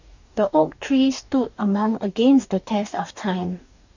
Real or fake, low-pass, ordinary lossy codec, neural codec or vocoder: fake; 7.2 kHz; none; codec, 44.1 kHz, 2.6 kbps, DAC